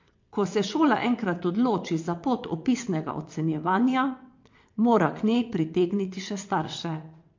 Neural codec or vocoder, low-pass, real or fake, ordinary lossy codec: vocoder, 22.05 kHz, 80 mel bands, WaveNeXt; 7.2 kHz; fake; MP3, 48 kbps